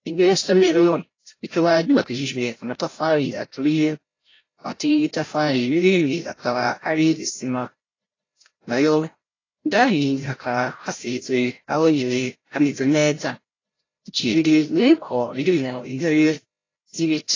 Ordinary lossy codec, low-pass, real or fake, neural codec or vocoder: AAC, 32 kbps; 7.2 kHz; fake; codec, 16 kHz, 0.5 kbps, FreqCodec, larger model